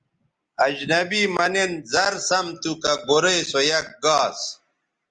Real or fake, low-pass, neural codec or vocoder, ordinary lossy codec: real; 9.9 kHz; none; Opus, 32 kbps